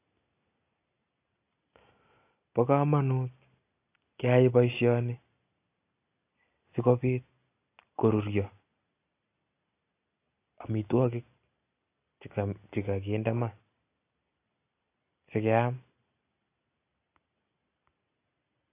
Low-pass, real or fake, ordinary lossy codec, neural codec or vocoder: 3.6 kHz; real; AAC, 24 kbps; none